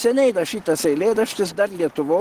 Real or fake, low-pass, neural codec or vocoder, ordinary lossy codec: real; 14.4 kHz; none; Opus, 16 kbps